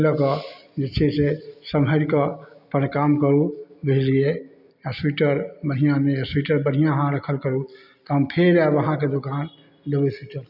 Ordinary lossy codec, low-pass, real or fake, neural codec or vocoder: none; 5.4 kHz; real; none